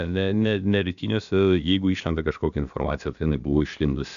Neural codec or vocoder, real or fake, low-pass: codec, 16 kHz, about 1 kbps, DyCAST, with the encoder's durations; fake; 7.2 kHz